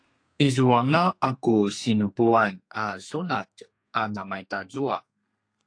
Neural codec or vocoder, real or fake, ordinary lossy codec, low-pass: codec, 32 kHz, 1.9 kbps, SNAC; fake; AAC, 48 kbps; 9.9 kHz